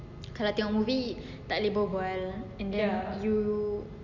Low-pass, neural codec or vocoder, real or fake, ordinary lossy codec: 7.2 kHz; none; real; none